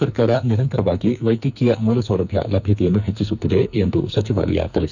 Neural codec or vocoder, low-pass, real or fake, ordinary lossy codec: codec, 44.1 kHz, 2.6 kbps, SNAC; 7.2 kHz; fake; none